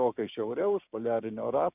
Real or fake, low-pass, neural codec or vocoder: fake; 3.6 kHz; codec, 16 kHz, 6 kbps, DAC